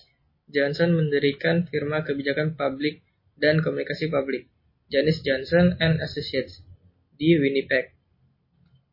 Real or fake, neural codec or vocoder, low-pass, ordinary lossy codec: real; none; 5.4 kHz; MP3, 24 kbps